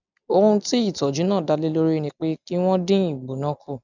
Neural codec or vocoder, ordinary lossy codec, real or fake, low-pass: none; none; real; 7.2 kHz